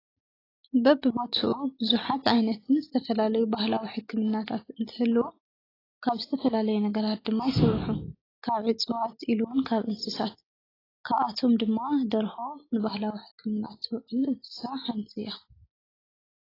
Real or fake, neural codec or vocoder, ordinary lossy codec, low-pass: real; none; AAC, 24 kbps; 5.4 kHz